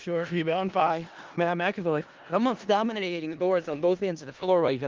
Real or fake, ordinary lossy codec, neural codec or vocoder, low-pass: fake; Opus, 32 kbps; codec, 16 kHz in and 24 kHz out, 0.4 kbps, LongCat-Audio-Codec, four codebook decoder; 7.2 kHz